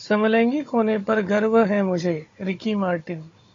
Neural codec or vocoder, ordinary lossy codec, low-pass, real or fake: codec, 16 kHz, 16 kbps, FunCodec, trained on Chinese and English, 50 frames a second; AAC, 32 kbps; 7.2 kHz; fake